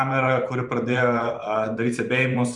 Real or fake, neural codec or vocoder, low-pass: fake; vocoder, 44.1 kHz, 128 mel bands every 512 samples, BigVGAN v2; 10.8 kHz